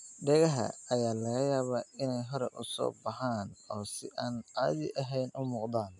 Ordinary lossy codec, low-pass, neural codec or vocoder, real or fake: none; 10.8 kHz; none; real